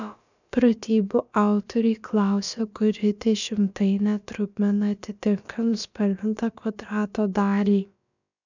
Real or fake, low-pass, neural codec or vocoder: fake; 7.2 kHz; codec, 16 kHz, about 1 kbps, DyCAST, with the encoder's durations